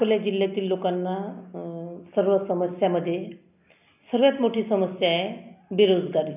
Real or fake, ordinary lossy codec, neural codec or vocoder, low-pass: real; none; none; 3.6 kHz